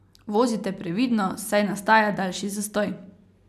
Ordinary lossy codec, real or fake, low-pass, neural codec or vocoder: none; real; 14.4 kHz; none